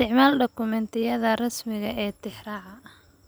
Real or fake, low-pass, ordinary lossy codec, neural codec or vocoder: real; none; none; none